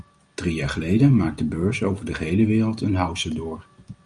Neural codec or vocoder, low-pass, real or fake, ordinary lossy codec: none; 9.9 kHz; real; Opus, 32 kbps